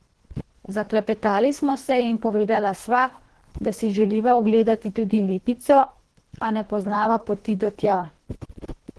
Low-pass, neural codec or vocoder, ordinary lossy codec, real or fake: 10.8 kHz; codec, 24 kHz, 1.5 kbps, HILCodec; Opus, 16 kbps; fake